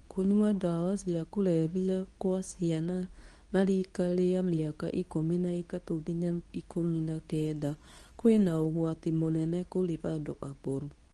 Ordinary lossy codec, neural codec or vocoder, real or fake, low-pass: Opus, 24 kbps; codec, 24 kHz, 0.9 kbps, WavTokenizer, medium speech release version 2; fake; 10.8 kHz